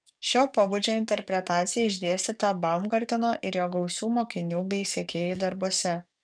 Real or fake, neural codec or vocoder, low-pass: fake; codec, 44.1 kHz, 7.8 kbps, DAC; 9.9 kHz